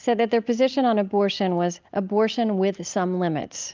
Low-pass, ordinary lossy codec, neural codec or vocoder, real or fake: 7.2 kHz; Opus, 32 kbps; none; real